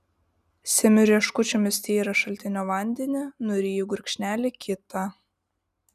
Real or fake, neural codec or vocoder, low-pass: real; none; 14.4 kHz